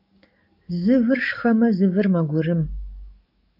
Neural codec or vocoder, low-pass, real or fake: codec, 44.1 kHz, 7.8 kbps, DAC; 5.4 kHz; fake